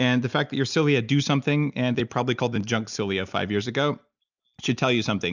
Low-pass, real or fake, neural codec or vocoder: 7.2 kHz; real; none